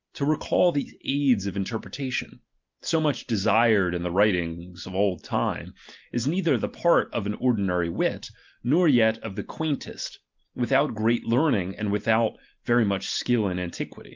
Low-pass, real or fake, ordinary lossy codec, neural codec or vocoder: 7.2 kHz; real; Opus, 32 kbps; none